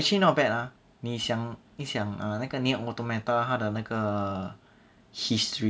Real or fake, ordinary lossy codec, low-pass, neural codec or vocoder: real; none; none; none